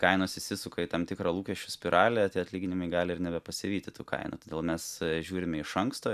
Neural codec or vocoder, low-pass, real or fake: none; 14.4 kHz; real